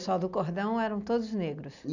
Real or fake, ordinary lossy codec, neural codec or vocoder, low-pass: real; none; none; 7.2 kHz